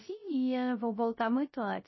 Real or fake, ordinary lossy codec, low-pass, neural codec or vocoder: fake; MP3, 24 kbps; 7.2 kHz; codec, 16 kHz, 0.3 kbps, FocalCodec